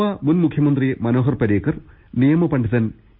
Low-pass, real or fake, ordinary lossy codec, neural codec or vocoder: 5.4 kHz; real; none; none